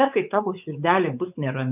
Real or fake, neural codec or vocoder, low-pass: fake; codec, 16 kHz, 4 kbps, X-Codec, WavLM features, trained on Multilingual LibriSpeech; 3.6 kHz